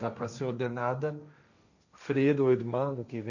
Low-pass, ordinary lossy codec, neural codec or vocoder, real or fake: 7.2 kHz; none; codec, 16 kHz, 1.1 kbps, Voila-Tokenizer; fake